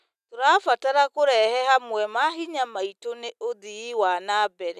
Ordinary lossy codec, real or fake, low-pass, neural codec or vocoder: none; real; 14.4 kHz; none